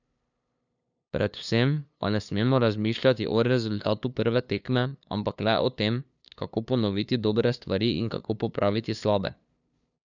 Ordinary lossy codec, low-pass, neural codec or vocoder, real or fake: none; 7.2 kHz; codec, 16 kHz, 2 kbps, FunCodec, trained on LibriTTS, 25 frames a second; fake